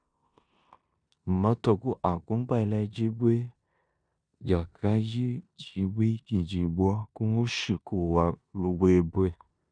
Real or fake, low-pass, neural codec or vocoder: fake; 9.9 kHz; codec, 16 kHz in and 24 kHz out, 0.9 kbps, LongCat-Audio-Codec, four codebook decoder